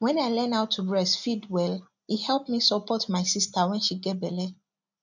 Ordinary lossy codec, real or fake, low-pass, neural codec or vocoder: none; real; 7.2 kHz; none